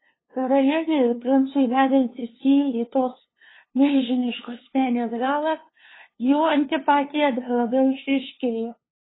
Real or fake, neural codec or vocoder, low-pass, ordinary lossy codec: fake; codec, 16 kHz, 2 kbps, FunCodec, trained on LibriTTS, 25 frames a second; 7.2 kHz; AAC, 16 kbps